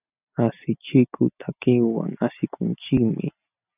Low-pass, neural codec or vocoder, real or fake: 3.6 kHz; none; real